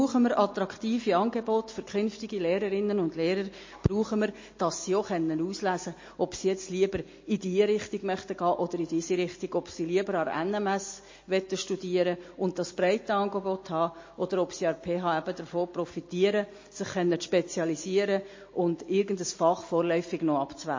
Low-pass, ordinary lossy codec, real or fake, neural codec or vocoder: 7.2 kHz; MP3, 32 kbps; real; none